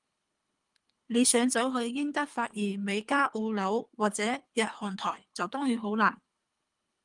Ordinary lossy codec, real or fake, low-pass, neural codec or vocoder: Opus, 32 kbps; fake; 10.8 kHz; codec, 24 kHz, 3 kbps, HILCodec